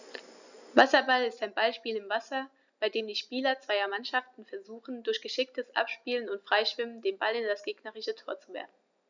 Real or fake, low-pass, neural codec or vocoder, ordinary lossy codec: real; 7.2 kHz; none; none